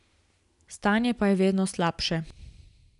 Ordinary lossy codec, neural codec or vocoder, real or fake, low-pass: none; none; real; 10.8 kHz